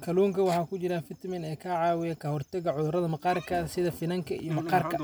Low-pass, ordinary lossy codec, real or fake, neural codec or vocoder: none; none; real; none